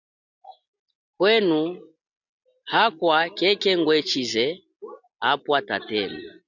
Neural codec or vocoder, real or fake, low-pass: none; real; 7.2 kHz